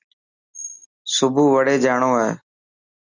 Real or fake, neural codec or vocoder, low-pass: real; none; 7.2 kHz